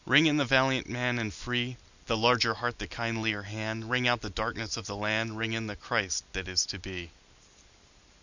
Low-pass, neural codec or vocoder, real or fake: 7.2 kHz; none; real